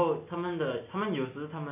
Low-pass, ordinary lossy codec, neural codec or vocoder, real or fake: 3.6 kHz; none; none; real